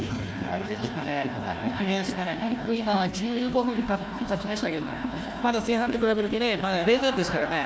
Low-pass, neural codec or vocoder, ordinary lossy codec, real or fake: none; codec, 16 kHz, 1 kbps, FunCodec, trained on Chinese and English, 50 frames a second; none; fake